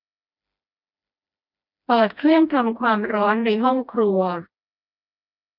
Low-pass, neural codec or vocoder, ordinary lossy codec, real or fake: 5.4 kHz; codec, 16 kHz, 1 kbps, FreqCodec, smaller model; none; fake